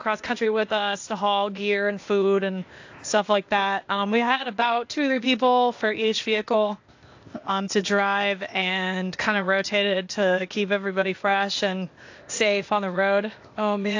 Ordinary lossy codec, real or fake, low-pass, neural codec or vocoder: AAC, 48 kbps; fake; 7.2 kHz; codec, 16 kHz, 0.8 kbps, ZipCodec